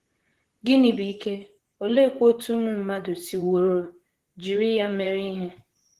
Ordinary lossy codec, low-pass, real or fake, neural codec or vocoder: Opus, 16 kbps; 14.4 kHz; fake; vocoder, 44.1 kHz, 128 mel bands, Pupu-Vocoder